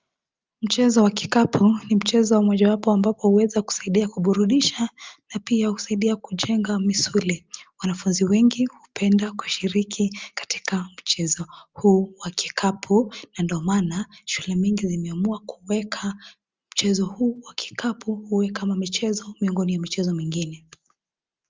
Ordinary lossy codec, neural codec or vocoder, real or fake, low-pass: Opus, 32 kbps; none; real; 7.2 kHz